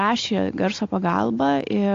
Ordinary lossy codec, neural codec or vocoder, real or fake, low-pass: AAC, 48 kbps; none; real; 7.2 kHz